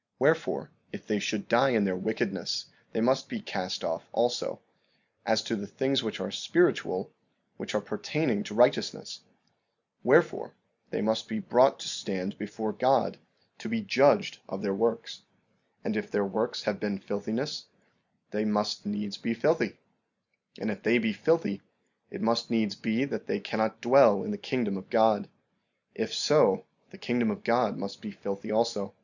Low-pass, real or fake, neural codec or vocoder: 7.2 kHz; real; none